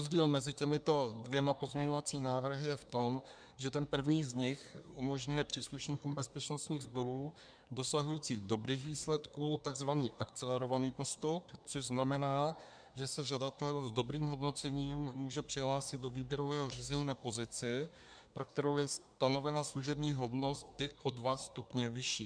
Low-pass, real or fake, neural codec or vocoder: 9.9 kHz; fake; codec, 24 kHz, 1 kbps, SNAC